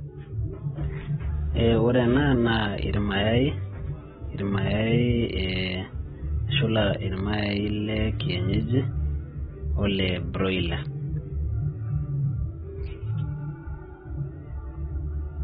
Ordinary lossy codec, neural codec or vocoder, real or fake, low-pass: AAC, 16 kbps; none; real; 19.8 kHz